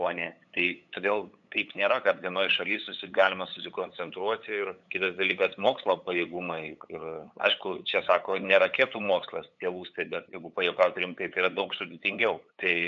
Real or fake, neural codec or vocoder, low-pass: fake; codec, 16 kHz, 8 kbps, FunCodec, trained on LibriTTS, 25 frames a second; 7.2 kHz